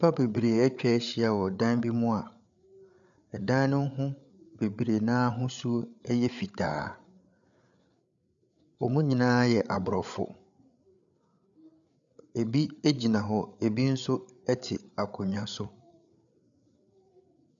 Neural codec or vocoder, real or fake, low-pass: codec, 16 kHz, 8 kbps, FreqCodec, larger model; fake; 7.2 kHz